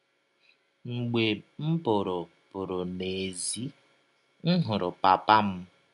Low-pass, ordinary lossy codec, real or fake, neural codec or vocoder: 10.8 kHz; none; real; none